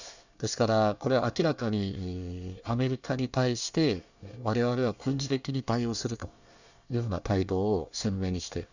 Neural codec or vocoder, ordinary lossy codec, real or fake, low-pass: codec, 24 kHz, 1 kbps, SNAC; none; fake; 7.2 kHz